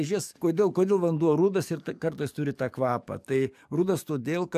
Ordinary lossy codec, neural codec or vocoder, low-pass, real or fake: AAC, 96 kbps; codec, 44.1 kHz, 7.8 kbps, DAC; 14.4 kHz; fake